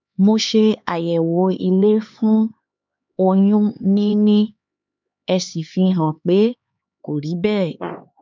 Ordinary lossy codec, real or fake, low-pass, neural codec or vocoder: MP3, 64 kbps; fake; 7.2 kHz; codec, 16 kHz, 4 kbps, X-Codec, HuBERT features, trained on LibriSpeech